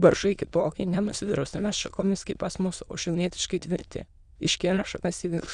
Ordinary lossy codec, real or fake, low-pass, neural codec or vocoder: Opus, 64 kbps; fake; 9.9 kHz; autoencoder, 22.05 kHz, a latent of 192 numbers a frame, VITS, trained on many speakers